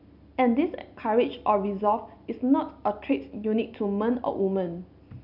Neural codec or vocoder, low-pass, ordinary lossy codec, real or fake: none; 5.4 kHz; none; real